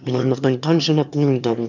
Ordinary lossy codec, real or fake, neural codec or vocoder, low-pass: none; fake; autoencoder, 22.05 kHz, a latent of 192 numbers a frame, VITS, trained on one speaker; 7.2 kHz